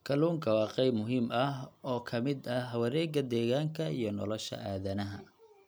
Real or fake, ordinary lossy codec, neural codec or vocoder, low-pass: real; none; none; none